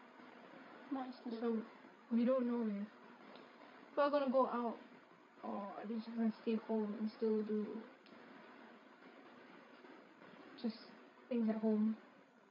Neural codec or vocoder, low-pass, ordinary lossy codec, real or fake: codec, 16 kHz, 8 kbps, FreqCodec, larger model; 7.2 kHz; none; fake